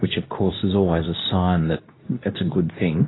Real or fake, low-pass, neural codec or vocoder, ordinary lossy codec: fake; 7.2 kHz; codec, 24 kHz, 0.9 kbps, WavTokenizer, medium speech release version 2; AAC, 16 kbps